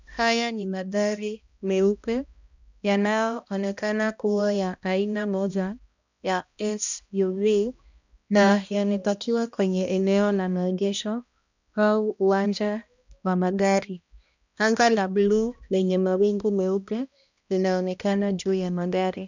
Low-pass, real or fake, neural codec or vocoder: 7.2 kHz; fake; codec, 16 kHz, 1 kbps, X-Codec, HuBERT features, trained on balanced general audio